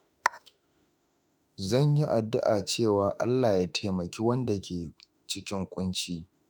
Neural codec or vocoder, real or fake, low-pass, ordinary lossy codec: autoencoder, 48 kHz, 32 numbers a frame, DAC-VAE, trained on Japanese speech; fake; none; none